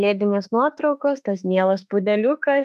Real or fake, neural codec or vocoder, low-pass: fake; autoencoder, 48 kHz, 32 numbers a frame, DAC-VAE, trained on Japanese speech; 14.4 kHz